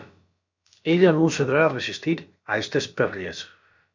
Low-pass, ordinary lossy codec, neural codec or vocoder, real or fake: 7.2 kHz; MP3, 64 kbps; codec, 16 kHz, about 1 kbps, DyCAST, with the encoder's durations; fake